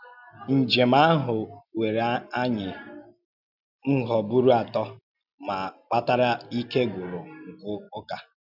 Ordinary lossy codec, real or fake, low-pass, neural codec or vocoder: none; real; 5.4 kHz; none